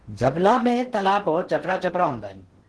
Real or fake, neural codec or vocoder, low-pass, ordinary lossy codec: fake; codec, 16 kHz in and 24 kHz out, 0.6 kbps, FocalCodec, streaming, 4096 codes; 10.8 kHz; Opus, 16 kbps